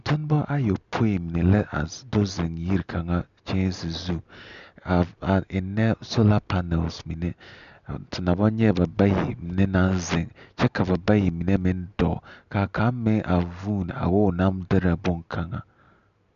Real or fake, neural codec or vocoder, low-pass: real; none; 7.2 kHz